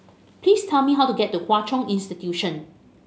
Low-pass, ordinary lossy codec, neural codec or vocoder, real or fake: none; none; none; real